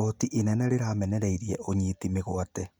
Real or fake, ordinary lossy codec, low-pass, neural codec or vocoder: real; none; none; none